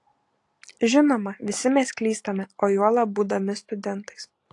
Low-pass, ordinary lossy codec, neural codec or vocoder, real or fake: 10.8 kHz; AAC, 48 kbps; none; real